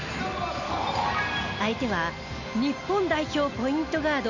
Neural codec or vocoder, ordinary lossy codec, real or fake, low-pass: none; none; real; 7.2 kHz